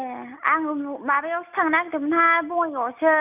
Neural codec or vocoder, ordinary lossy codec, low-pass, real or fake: none; none; 3.6 kHz; real